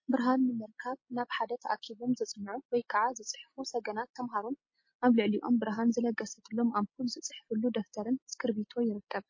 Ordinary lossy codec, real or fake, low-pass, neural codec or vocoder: MP3, 32 kbps; real; 7.2 kHz; none